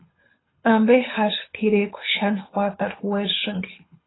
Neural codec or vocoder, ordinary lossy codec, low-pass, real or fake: codec, 24 kHz, 6 kbps, HILCodec; AAC, 16 kbps; 7.2 kHz; fake